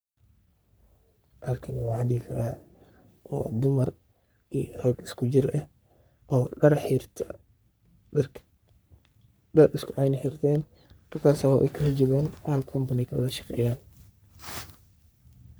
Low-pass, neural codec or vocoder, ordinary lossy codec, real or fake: none; codec, 44.1 kHz, 3.4 kbps, Pupu-Codec; none; fake